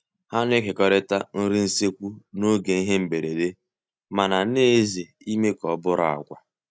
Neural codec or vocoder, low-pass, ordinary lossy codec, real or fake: none; none; none; real